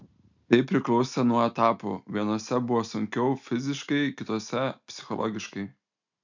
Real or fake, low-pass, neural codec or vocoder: real; 7.2 kHz; none